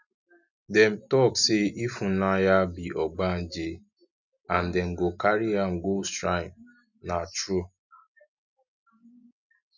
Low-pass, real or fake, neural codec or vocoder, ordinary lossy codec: 7.2 kHz; real; none; none